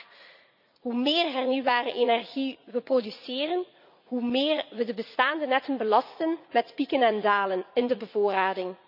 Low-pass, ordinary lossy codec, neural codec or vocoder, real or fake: 5.4 kHz; none; vocoder, 44.1 kHz, 80 mel bands, Vocos; fake